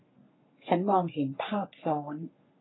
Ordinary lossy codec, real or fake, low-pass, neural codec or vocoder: AAC, 16 kbps; fake; 7.2 kHz; codec, 44.1 kHz, 3.4 kbps, Pupu-Codec